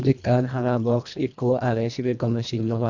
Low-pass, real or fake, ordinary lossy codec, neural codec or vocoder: 7.2 kHz; fake; none; codec, 24 kHz, 1.5 kbps, HILCodec